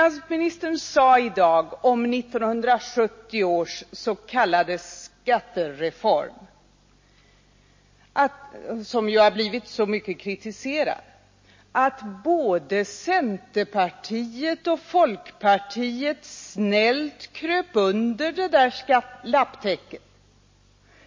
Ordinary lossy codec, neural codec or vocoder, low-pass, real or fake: MP3, 32 kbps; none; 7.2 kHz; real